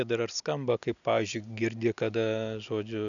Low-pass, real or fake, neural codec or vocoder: 7.2 kHz; real; none